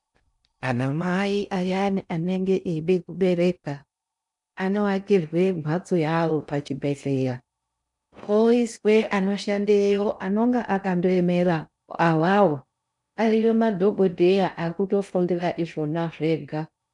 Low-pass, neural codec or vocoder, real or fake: 10.8 kHz; codec, 16 kHz in and 24 kHz out, 0.6 kbps, FocalCodec, streaming, 4096 codes; fake